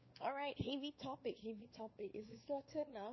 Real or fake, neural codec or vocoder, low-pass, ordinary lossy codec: fake; codec, 16 kHz, 4 kbps, X-Codec, WavLM features, trained on Multilingual LibriSpeech; 7.2 kHz; MP3, 24 kbps